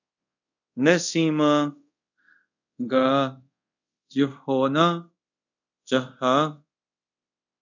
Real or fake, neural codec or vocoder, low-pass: fake; codec, 24 kHz, 0.5 kbps, DualCodec; 7.2 kHz